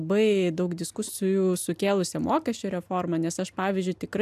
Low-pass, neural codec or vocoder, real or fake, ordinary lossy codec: 14.4 kHz; none; real; AAC, 96 kbps